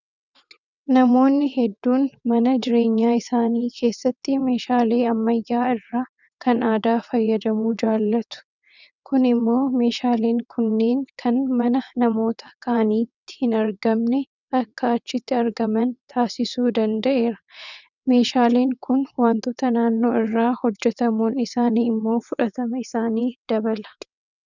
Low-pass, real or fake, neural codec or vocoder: 7.2 kHz; fake; vocoder, 22.05 kHz, 80 mel bands, WaveNeXt